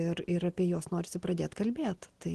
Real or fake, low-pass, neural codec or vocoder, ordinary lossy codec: real; 10.8 kHz; none; Opus, 16 kbps